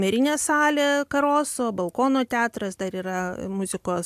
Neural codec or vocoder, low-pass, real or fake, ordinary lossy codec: none; 14.4 kHz; real; AAC, 96 kbps